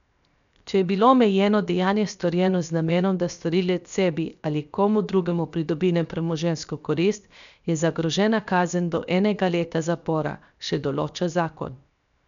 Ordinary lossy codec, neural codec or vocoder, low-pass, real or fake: none; codec, 16 kHz, 0.7 kbps, FocalCodec; 7.2 kHz; fake